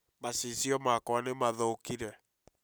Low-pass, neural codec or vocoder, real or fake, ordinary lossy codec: none; none; real; none